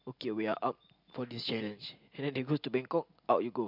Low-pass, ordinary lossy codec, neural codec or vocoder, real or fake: 5.4 kHz; MP3, 48 kbps; none; real